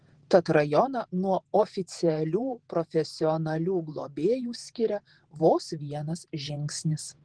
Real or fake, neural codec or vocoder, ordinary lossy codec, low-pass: fake; vocoder, 48 kHz, 128 mel bands, Vocos; Opus, 16 kbps; 9.9 kHz